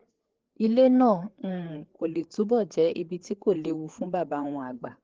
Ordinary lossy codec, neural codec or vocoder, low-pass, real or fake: Opus, 16 kbps; codec, 16 kHz, 4 kbps, FreqCodec, larger model; 7.2 kHz; fake